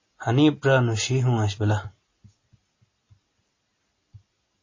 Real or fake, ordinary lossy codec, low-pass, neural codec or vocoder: real; MP3, 32 kbps; 7.2 kHz; none